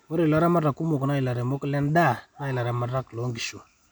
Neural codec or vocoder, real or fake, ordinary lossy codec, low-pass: none; real; none; none